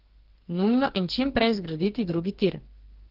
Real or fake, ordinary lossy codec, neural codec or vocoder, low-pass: fake; Opus, 16 kbps; codec, 44.1 kHz, 2.6 kbps, SNAC; 5.4 kHz